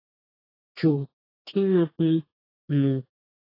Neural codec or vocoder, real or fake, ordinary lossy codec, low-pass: codec, 32 kHz, 1.9 kbps, SNAC; fake; AAC, 24 kbps; 5.4 kHz